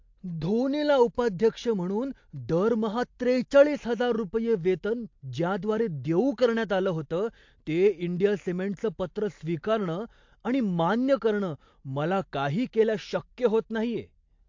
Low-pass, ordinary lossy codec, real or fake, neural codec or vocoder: 7.2 kHz; MP3, 48 kbps; real; none